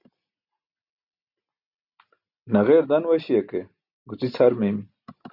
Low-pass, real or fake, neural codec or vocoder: 5.4 kHz; real; none